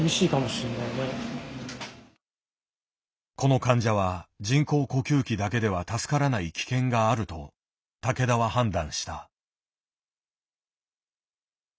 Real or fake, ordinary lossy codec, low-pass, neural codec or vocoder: real; none; none; none